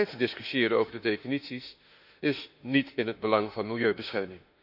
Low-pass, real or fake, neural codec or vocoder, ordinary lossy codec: 5.4 kHz; fake; autoencoder, 48 kHz, 32 numbers a frame, DAC-VAE, trained on Japanese speech; none